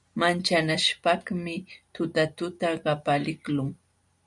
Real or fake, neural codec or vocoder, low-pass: real; none; 10.8 kHz